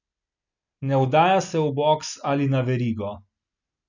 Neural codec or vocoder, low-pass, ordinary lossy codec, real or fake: none; 7.2 kHz; none; real